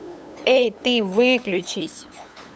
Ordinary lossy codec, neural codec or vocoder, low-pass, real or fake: none; codec, 16 kHz, 8 kbps, FunCodec, trained on LibriTTS, 25 frames a second; none; fake